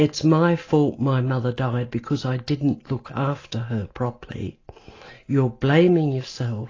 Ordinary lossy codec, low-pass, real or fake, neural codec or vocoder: AAC, 32 kbps; 7.2 kHz; real; none